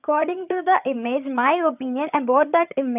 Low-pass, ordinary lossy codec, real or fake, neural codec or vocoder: 3.6 kHz; none; fake; vocoder, 22.05 kHz, 80 mel bands, HiFi-GAN